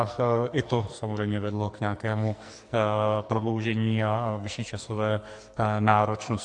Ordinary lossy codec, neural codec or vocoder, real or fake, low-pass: AAC, 64 kbps; codec, 44.1 kHz, 2.6 kbps, SNAC; fake; 10.8 kHz